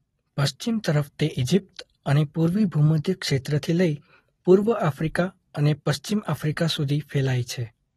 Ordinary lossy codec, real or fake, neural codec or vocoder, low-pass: AAC, 32 kbps; real; none; 14.4 kHz